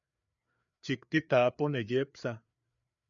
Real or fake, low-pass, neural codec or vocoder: fake; 7.2 kHz; codec, 16 kHz, 4 kbps, FreqCodec, larger model